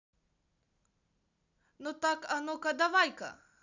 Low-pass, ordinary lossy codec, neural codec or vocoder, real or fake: 7.2 kHz; none; none; real